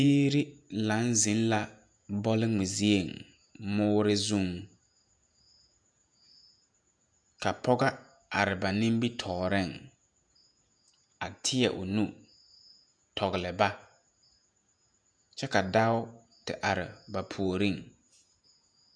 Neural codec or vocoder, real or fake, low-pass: vocoder, 48 kHz, 128 mel bands, Vocos; fake; 9.9 kHz